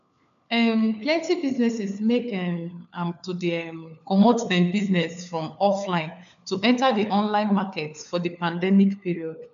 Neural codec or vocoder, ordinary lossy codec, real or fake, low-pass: codec, 16 kHz, 4 kbps, FunCodec, trained on LibriTTS, 50 frames a second; none; fake; 7.2 kHz